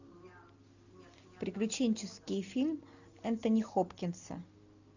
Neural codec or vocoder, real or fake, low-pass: none; real; 7.2 kHz